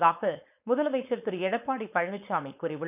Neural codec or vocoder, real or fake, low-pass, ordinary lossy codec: codec, 16 kHz, 4.8 kbps, FACodec; fake; 3.6 kHz; none